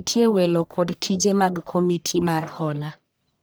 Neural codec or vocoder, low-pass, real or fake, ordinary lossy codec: codec, 44.1 kHz, 1.7 kbps, Pupu-Codec; none; fake; none